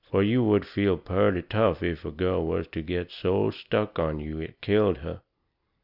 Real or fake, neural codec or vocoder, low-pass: real; none; 5.4 kHz